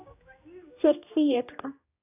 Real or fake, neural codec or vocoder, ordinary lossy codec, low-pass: fake; codec, 16 kHz, 1 kbps, X-Codec, HuBERT features, trained on general audio; AAC, 24 kbps; 3.6 kHz